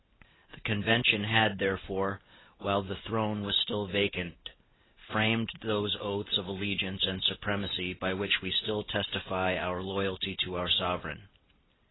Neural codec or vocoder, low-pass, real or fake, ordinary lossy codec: none; 7.2 kHz; real; AAC, 16 kbps